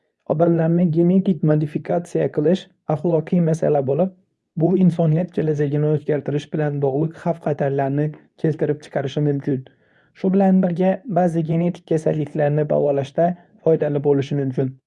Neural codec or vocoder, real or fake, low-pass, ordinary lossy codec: codec, 24 kHz, 0.9 kbps, WavTokenizer, medium speech release version 2; fake; none; none